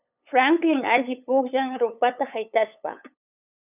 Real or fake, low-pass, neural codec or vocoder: fake; 3.6 kHz; codec, 16 kHz, 8 kbps, FunCodec, trained on LibriTTS, 25 frames a second